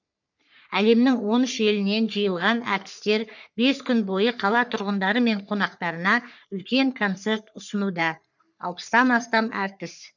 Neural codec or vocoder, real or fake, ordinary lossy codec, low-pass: codec, 44.1 kHz, 3.4 kbps, Pupu-Codec; fake; none; 7.2 kHz